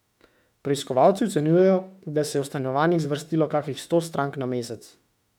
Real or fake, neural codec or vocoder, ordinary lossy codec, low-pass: fake; autoencoder, 48 kHz, 32 numbers a frame, DAC-VAE, trained on Japanese speech; none; 19.8 kHz